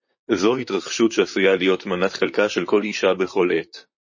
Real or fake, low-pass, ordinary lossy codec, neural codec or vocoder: fake; 7.2 kHz; MP3, 32 kbps; vocoder, 44.1 kHz, 128 mel bands, Pupu-Vocoder